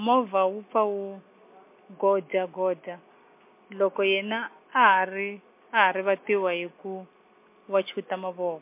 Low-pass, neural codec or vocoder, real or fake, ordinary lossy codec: 3.6 kHz; none; real; MP3, 24 kbps